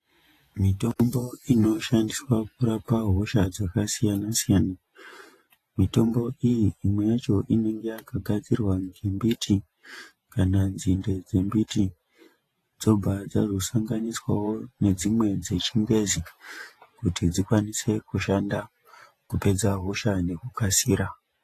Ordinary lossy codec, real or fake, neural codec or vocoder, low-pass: AAC, 48 kbps; fake; vocoder, 48 kHz, 128 mel bands, Vocos; 14.4 kHz